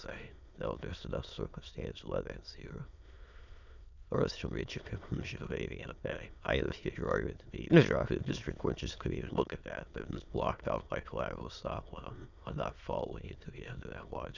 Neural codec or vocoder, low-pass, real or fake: autoencoder, 22.05 kHz, a latent of 192 numbers a frame, VITS, trained on many speakers; 7.2 kHz; fake